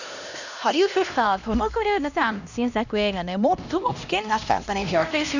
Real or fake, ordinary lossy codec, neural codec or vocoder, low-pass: fake; none; codec, 16 kHz, 1 kbps, X-Codec, HuBERT features, trained on LibriSpeech; 7.2 kHz